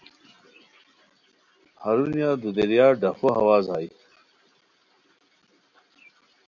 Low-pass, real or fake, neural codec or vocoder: 7.2 kHz; real; none